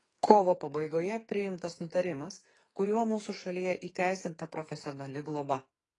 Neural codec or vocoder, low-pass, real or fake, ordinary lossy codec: codec, 44.1 kHz, 2.6 kbps, SNAC; 10.8 kHz; fake; AAC, 32 kbps